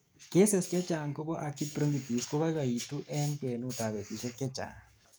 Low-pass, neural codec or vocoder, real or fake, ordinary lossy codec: none; codec, 44.1 kHz, 7.8 kbps, DAC; fake; none